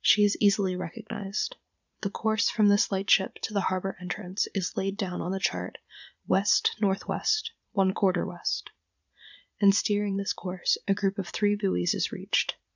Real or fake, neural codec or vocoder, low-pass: fake; vocoder, 44.1 kHz, 80 mel bands, Vocos; 7.2 kHz